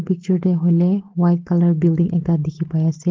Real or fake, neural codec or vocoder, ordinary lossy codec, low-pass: real; none; Opus, 16 kbps; 7.2 kHz